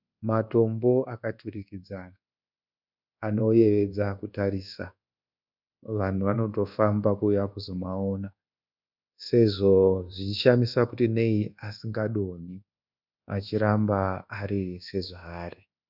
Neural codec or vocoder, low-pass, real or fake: codec, 24 kHz, 1.2 kbps, DualCodec; 5.4 kHz; fake